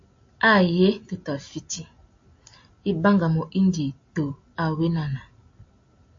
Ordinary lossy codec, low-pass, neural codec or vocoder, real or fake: AAC, 48 kbps; 7.2 kHz; none; real